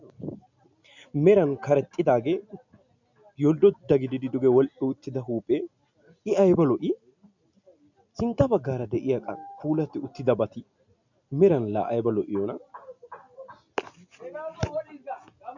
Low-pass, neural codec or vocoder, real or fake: 7.2 kHz; none; real